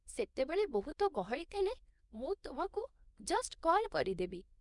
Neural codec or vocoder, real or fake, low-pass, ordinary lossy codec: codec, 24 kHz, 0.9 kbps, WavTokenizer, small release; fake; 10.8 kHz; none